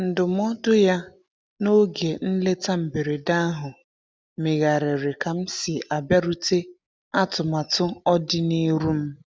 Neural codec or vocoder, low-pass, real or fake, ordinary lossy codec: none; none; real; none